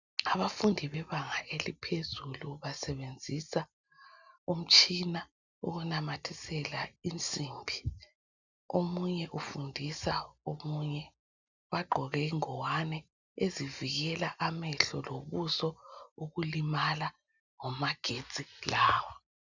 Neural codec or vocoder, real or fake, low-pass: none; real; 7.2 kHz